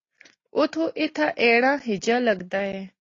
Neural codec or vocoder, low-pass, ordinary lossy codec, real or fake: none; 7.2 kHz; AAC, 32 kbps; real